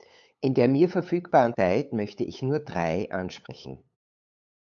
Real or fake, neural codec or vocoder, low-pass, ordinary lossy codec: fake; codec, 16 kHz, 8 kbps, FunCodec, trained on LibriTTS, 25 frames a second; 7.2 kHz; MP3, 96 kbps